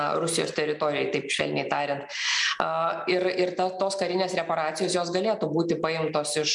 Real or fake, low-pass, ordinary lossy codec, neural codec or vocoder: real; 10.8 kHz; MP3, 96 kbps; none